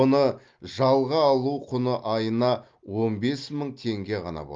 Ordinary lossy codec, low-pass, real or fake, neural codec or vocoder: Opus, 32 kbps; 7.2 kHz; real; none